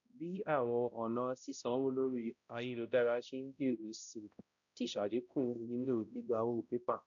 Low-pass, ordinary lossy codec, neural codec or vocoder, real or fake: 7.2 kHz; none; codec, 16 kHz, 0.5 kbps, X-Codec, HuBERT features, trained on balanced general audio; fake